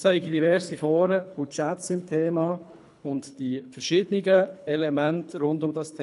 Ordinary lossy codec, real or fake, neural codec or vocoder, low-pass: none; fake; codec, 24 kHz, 3 kbps, HILCodec; 10.8 kHz